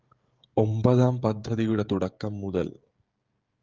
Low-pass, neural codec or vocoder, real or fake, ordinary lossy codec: 7.2 kHz; codec, 16 kHz, 16 kbps, FreqCodec, smaller model; fake; Opus, 32 kbps